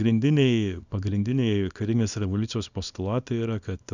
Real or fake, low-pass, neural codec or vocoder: fake; 7.2 kHz; codec, 24 kHz, 0.9 kbps, WavTokenizer, small release